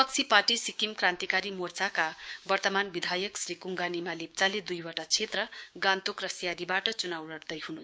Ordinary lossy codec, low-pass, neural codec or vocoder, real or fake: none; none; codec, 16 kHz, 6 kbps, DAC; fake